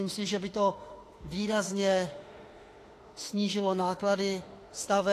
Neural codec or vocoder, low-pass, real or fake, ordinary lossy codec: autoencoder, 48 kHz, 32 numbers a frame, DAC-VAE, trained on Japanese speech; 14.4 kHz; fake; AAC, 48 kbps